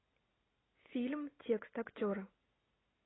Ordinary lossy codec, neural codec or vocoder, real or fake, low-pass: AAC, 16 kbps; none; real; 7.2 kHz